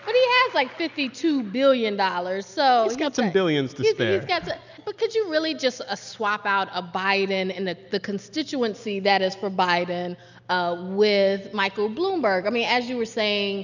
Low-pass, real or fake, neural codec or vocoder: 7.2 kHz; real; none